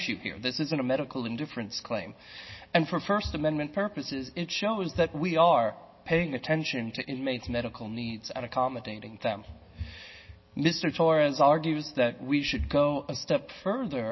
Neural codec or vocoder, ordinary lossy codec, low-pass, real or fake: none; MP3, 24 kbps; 7.2 kHz; real